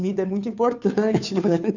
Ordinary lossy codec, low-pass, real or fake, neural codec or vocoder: none; 7.2 kHz; fake; codec, 16 kHz, 2 kbps, FunCodec, trained on Chinese and English, 25 frames a second